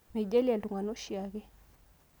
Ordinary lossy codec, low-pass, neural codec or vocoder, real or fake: none; none; none; real